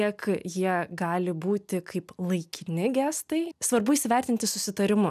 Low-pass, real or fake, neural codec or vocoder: 14.4 kHz; real; none